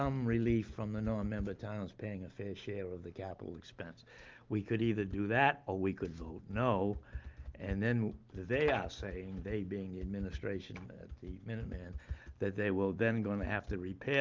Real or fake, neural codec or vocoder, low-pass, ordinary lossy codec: real; none; 7.2 kHz; Opus, 32 kbps